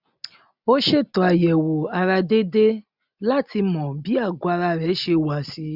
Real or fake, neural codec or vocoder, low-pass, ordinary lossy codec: real; none; 5.4 kHz; none